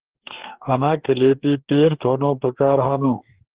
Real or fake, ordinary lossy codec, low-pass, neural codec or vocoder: fake; Opus, 16 kbps; 3.6 kHz; codec, 44.1 kHz, 2.6 kbps, DAC